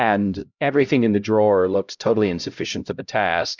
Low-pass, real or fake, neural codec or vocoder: 7.2 kHz; fake; codec, 16 kHz, 0.5 kbps, X-Codec, HuBERT features, trained on LibriSpeech